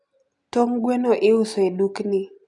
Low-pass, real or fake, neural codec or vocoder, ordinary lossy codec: 10.8 kHz; real; none; none